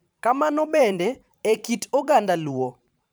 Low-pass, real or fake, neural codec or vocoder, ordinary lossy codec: none; fake; vocoder, 44.1 kHz, 128 mel bands every 512 samples, BigVGAN v2; none